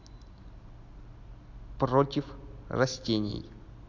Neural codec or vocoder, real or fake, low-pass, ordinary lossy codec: none; real; 7.2 kHz; MP3, 64 kbps